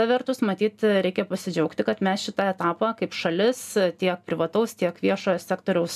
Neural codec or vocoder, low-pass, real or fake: none; 14.4 kHz; real